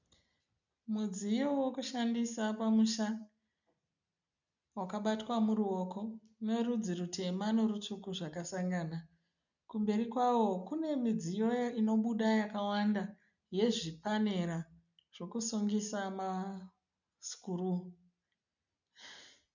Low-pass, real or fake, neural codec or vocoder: 7.2 kHz; real; none